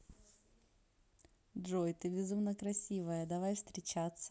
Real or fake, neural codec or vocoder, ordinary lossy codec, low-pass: real; none; none; none